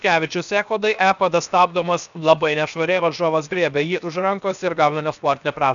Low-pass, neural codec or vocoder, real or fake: 7.2 kHz; codec, 16 kHz, 0.7 kbps, FocalCodec; fake